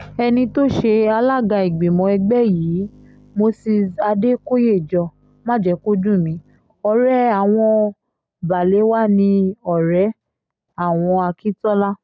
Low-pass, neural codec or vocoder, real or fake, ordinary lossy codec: none; none; real; none